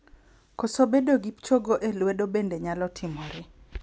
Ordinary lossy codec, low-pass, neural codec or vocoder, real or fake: none; none; none; real